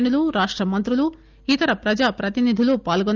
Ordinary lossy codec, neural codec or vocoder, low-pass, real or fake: Opus, 24 kbps; none; 7.2 kHz; real